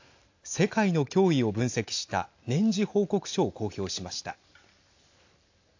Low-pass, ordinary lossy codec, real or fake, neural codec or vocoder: 7.2 kHz; AAC, 48 kbps; real; none